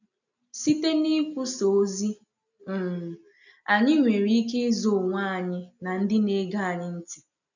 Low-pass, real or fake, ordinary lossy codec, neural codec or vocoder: 7.2 kHz; real; none; none